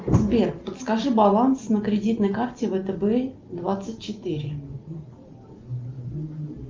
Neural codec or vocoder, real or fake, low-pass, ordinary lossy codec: none; real; 7.2 kHz; Opus, 24 kbps